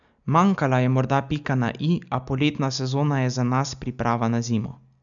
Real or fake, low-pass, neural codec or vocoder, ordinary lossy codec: real; 7.2 kHz; none; none